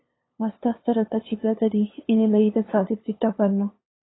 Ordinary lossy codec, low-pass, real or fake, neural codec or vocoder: AAC, 16 kbps; 7.2 kHz; fake; codec, 16 kHz, 2 kbps, FunCodec, trained on LibriTTS, 25 frames a second